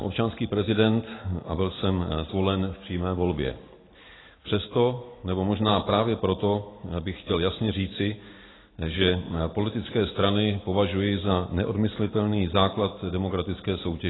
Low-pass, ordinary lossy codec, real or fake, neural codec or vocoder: 7.2 kHz; AAC, 16 kbps; real; none